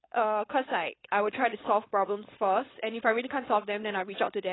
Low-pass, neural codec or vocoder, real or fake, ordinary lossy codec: 7.2 kHz; codec, 16 kHz, 4.8 kbps, FACodec; fake; AAC, 16 kbps